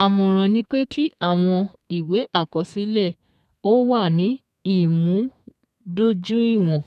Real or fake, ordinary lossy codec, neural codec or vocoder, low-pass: fake; none; codec, 32 kHz, 1.9 kbps, SNAC; 14.4 kHz